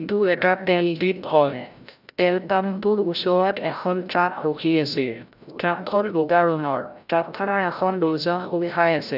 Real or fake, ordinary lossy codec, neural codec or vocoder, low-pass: fake; none; codec, 16 kHz, 0.5 kbps, FreqCodec, larger model; 5.4 kHz